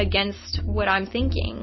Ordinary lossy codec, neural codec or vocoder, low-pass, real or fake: MP3, 24 kbps; none; 7.2 kHz; real